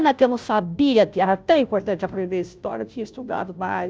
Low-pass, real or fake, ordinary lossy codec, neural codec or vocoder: none; fake; none; codec, 16 kHz, 0.5 kbps, FunCodec, trained on Chinese and English, 25 frames a second